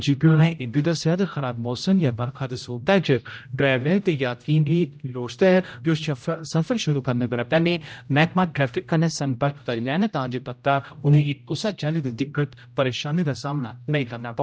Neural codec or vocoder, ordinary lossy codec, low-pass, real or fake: codec, 16 kHz, 0.5 kbps, X-Codec, HuBERT features, trained on general audio; none; none; fake